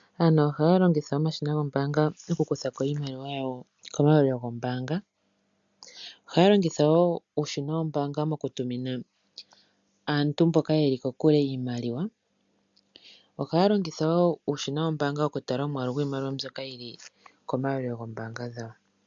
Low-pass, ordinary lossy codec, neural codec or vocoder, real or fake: 7.2 kHz; MP3, 64 kbps; none; real